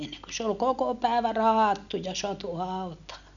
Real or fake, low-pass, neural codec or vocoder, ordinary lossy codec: real; 7.2 kHz; none; none